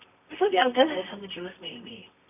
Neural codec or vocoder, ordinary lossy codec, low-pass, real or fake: codec, 24 kHz, 0.9 kbps, WavTokenizer, medium music audio release; none; 3.6 kHz; fake